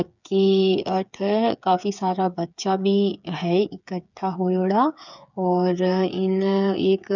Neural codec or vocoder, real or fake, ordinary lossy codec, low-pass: codec, 16 kHz, 8 kbps, FreqCodec, smaller model; fake; none; 7.2 kHz